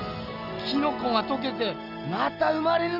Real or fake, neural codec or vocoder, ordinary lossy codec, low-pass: real; none; Opus, 64 kbps; 5.4 kHz